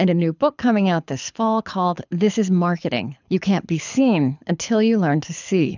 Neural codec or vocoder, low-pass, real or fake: codec, 24 kHz, 6 kbps, HILCodec; 7.2 kHz; fake